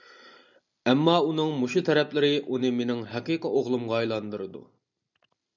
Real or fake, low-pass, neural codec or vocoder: real; 7.2 kHz; none